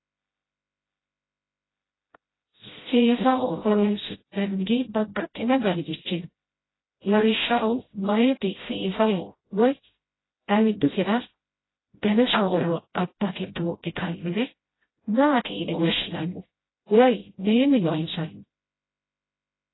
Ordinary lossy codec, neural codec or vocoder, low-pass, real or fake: AAC, 16 kbps; codec, 16 kHz, 0.5 kbps, FreqCodec, smaller model; 7.2 kHz; fake